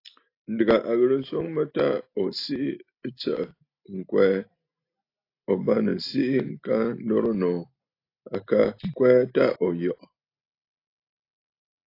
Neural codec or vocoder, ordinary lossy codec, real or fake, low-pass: none; AAC, 32 kbps; real; 5.4 kHz